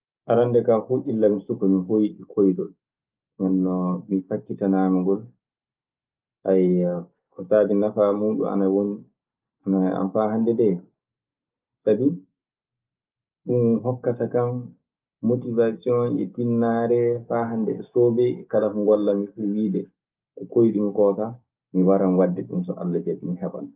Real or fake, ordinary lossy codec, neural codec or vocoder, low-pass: real; Opus, 32 kbps; none; 3.6 kHz